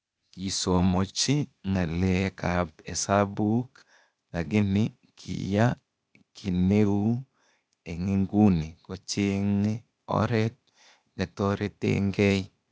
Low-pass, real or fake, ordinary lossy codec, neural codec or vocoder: none; fake; none; codec, 16 kHz, 0.8 kbps, ZipCodec